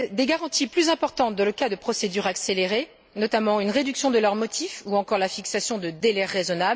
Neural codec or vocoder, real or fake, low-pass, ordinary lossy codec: none; real; none; none